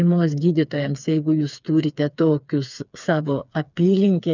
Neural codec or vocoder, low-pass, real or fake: codec, 16 kHz, 4 kbps, FreqCodec, smaller model; 7.2 kHz; fake